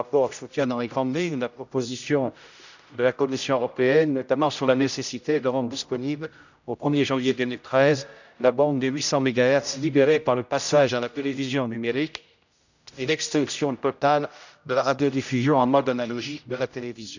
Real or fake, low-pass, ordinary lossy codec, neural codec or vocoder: fake; 7.2 kHz; none; codec, 16 kHz, 0.5 kbps, X-Codec, HuBERT features, trained on general audio